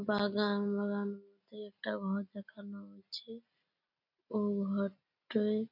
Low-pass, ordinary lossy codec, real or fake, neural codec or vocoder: 5.4 kHz; none; real; none